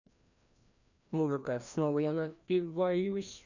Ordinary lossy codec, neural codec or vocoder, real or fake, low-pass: none; codec, 16 kHz, 1 kbps, FreqCodec, larger model; fake; 7.2 kHz